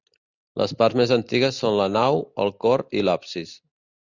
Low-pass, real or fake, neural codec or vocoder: 7.2 kHz; real; none